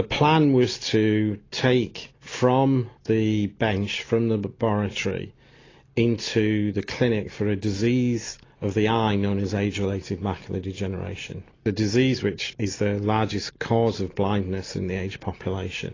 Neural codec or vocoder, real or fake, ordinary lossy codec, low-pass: none; real; AAC, 32 kbps; 7.2 kHz